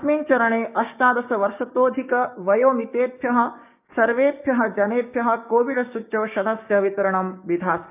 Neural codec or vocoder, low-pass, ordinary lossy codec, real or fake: codec, 16 kHz, 6 kbps, DAC; 3.6 kHz; none; fake